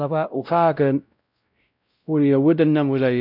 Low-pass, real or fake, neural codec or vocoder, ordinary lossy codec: 5.4 kHz; fake; codec, 16 kHz, 0.5 kbps, X-Codec, WavLM features, trained on Multilingual LibriSpeech; none